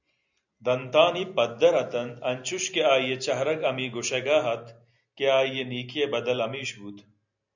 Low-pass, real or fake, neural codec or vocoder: 7.2 kHz; real; none